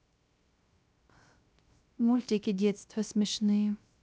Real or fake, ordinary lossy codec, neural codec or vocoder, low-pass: fake; none; codec, 16 kHz, 0.3 kbps, FocalCodec; none